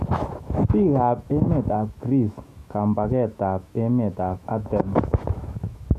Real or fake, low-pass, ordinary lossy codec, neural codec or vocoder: fake; 14.4 kHz; MP3, 64 kbps; autoencoder, 48 kHz, 128 numbers a frame, DAC-VAE, trained on Japanese speech